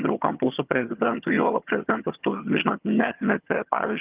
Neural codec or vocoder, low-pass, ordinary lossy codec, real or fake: vocoder, 22.05 kHz, 80 mel bands, HiFi-GAN; 3.6 kHz; Opus, 32 kbps; fake